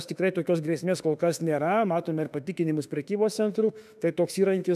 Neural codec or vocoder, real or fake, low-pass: autoencoder, 48 kHz, 32 numbers a frame, DAC-VAE, trained on Japanese speech; fake; 14.4 kHz